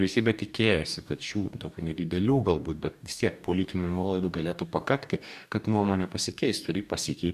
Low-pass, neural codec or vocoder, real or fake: 14.4 kHz; codec, 44.1 kHz, 2.6 kbps, DAC; fake